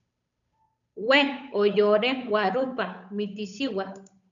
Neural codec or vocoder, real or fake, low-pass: codec, 16 kHz, 8 kbps, FunCodec, trained on Chinese and English, 25 frames a second; fake; 7.2 kHz